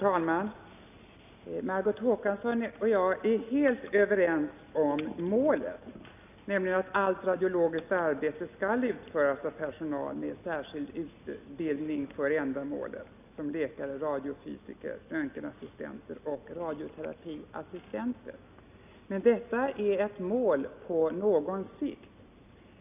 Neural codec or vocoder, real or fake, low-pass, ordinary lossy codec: none; real; 3.6 kHz; none